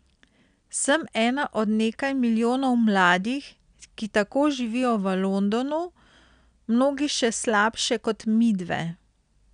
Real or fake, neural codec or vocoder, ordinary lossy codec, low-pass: real; none; none; 9.9 kHz